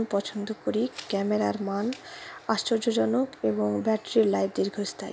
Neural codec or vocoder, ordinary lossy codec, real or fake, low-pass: none; none; real; none